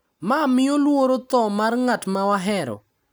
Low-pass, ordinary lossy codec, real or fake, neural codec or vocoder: none; none; real; none